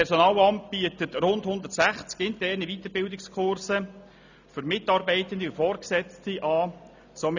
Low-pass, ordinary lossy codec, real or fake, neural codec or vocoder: 7.2 kHz; none; real; none